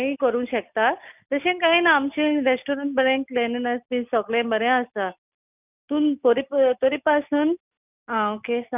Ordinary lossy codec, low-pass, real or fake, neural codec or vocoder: none; 3.6 kHz; real; none